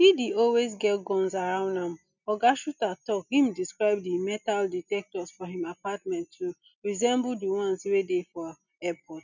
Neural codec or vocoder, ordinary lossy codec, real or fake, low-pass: none; none; real; 7.2 kHz